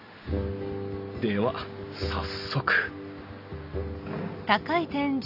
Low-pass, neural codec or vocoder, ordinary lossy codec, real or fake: 5.4 kHz; none; none; real